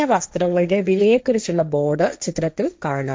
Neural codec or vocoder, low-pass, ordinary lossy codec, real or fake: codec, 16 kHz, 1.1 kbps, Voila-Tokenizer; none; none; fake